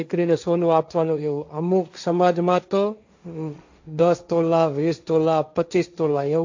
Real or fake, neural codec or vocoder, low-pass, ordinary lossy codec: fake; codec, 16 kHz, 1.1 kbps, Voila-Tokenizer; 7.2 kHz; AAC, 48 kbps